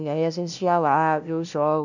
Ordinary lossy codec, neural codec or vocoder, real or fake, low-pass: none; codec, 16 kHz, 0.5 kbps, FunCodec, trained on LibriTTS, 25 frames a second; fake; 7.2 kHz